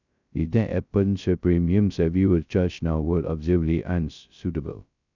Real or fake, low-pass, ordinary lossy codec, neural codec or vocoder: fake; 7.2 kHz; none; codec, 16 kHz, 0.2 kbps, FocalCodec